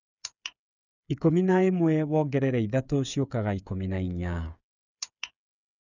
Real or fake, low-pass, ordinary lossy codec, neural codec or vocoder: fake; 7.2 kHz; none; codec, 16 kHz, 8 kbps, FreqCodec, smaller model